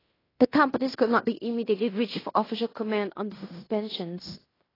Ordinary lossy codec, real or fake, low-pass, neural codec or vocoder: AAC, 24 kbps; fake; 5.4 kHz; codec, 16 kHz in and 24 kHz out, 0.9 kbps, LongCat-Audio-Codec, fine tuned four codebook decoder